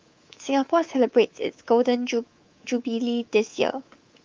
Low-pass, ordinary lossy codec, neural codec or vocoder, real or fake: 7.2 kHz; Opus, 32 kbps; codec, 24 kHz, 3.1 kbps, DualCodec; fake